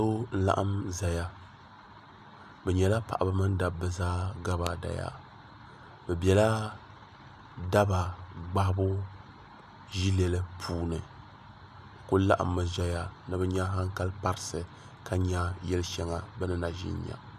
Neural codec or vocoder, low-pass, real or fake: none; 14.4 kHz; real